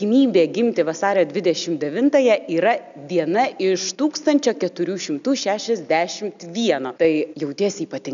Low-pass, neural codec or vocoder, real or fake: 7.2 kHz; none; real